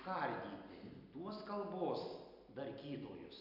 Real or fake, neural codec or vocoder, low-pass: real; none; 5.4 kHz